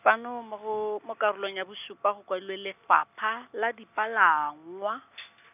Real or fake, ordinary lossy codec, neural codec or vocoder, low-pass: real; none; none; 3.6 kHz